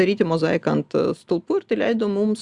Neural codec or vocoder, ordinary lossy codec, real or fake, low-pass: none; Opus, 64 kbps; real; 10.8 kHz